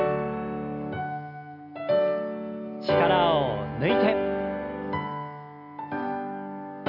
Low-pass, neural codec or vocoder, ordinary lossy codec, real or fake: 5.4 kHz; none; none; real